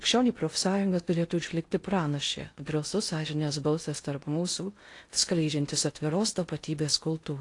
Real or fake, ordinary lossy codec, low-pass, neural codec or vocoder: fake; AAC, 48 kbps; 10.8 kHz; codec, 16 kHz in and 24 kHz out, 0.6 kbps, FocalCodec, streaming, 2048 codes